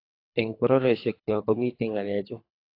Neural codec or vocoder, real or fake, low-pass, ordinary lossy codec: codec, 24 kHz, 3 kbps, HILCodec; fake; 5.4 kHz; AAC, 32 kbps